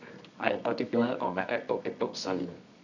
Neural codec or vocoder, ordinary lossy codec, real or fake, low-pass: codec, 24 kHz, 0.9 kbps, WavTokenizer, medium music audio release; none; fake; 7.2 kHz